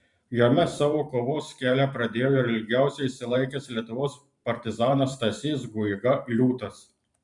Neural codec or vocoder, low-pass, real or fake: vocoder, 44.1 kHz, 128 mel bands every 512 samples, BigVGAN v2; 10.8 kHz; fake